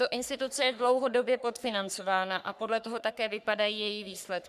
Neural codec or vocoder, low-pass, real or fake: codec, 44.1 kHz, 3.4 kbps, Pupu-Codec; 14.4 kHz; fake